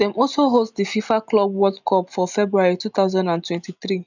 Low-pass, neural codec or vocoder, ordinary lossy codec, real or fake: 7.2 kHz; none; none; real